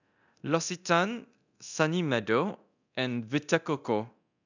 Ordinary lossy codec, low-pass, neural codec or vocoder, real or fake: none; 7.2 kHz; codec, 24 kHz, 0.9 kbps, DualCodec; fake